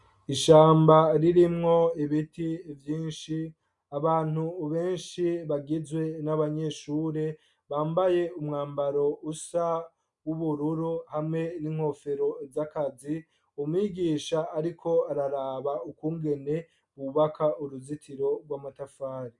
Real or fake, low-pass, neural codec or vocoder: real; 10.8 kHz; none